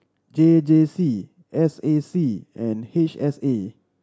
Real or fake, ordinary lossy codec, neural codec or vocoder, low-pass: real; none; none; none